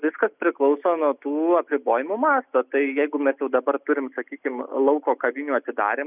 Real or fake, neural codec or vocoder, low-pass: real; none; 3.6 kHz